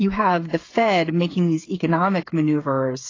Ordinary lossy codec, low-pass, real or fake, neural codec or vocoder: AAC, 32 kbps; 7.2 kHz; fake; codec, 16 kHz, 8 kbps, FreqCodec, smaller model